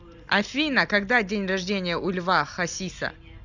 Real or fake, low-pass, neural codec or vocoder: real; 7.2 kHz; none